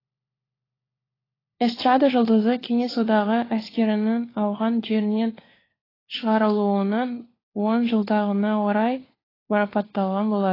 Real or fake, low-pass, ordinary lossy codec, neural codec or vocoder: fake; 5.4 kHz; AAC, 24 kbps; codec, 16 kHz, 4 kbps, FunCodec, trained on LibriTTS, 50 frames a second